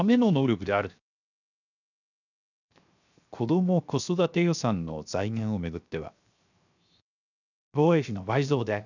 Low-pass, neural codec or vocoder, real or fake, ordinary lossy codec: 7.2 kHz; codec, 16 kHz, 0.7 kbps, FocalCodec; fake; none